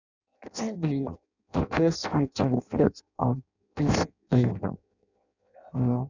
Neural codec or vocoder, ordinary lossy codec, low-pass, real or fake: codec, 16 kHz in and 24 kHz out, 0.6 kbps, FireRedTTS-2 codec; none; 7.2 kHz; fake